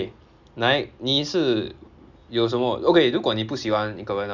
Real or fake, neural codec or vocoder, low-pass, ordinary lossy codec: real; none; 7.2 kHz; none